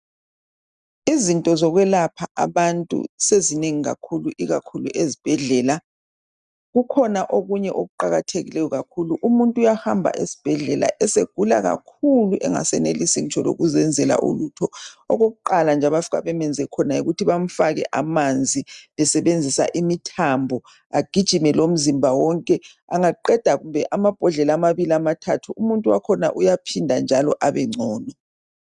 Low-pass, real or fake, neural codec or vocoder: 10.8 kHz; real; none